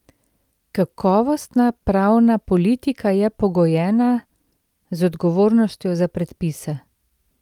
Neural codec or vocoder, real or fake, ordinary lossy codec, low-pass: none; real; Opus, 32 kbps; 19.8 kHz